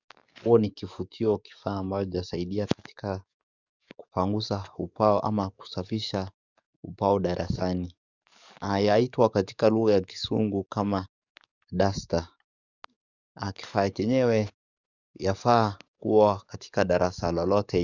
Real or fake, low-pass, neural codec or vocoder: fake; 7.2 kHz; codec, 44.1 kHz, 7.8 kbps, DAC